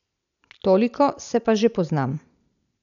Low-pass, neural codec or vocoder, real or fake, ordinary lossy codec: 7.2 kHz; none; real; none